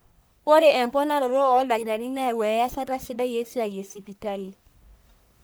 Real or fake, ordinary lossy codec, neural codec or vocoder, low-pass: fake; none; codec, 44.1 kHz, 1.7 kbps, Pupu-Codec; none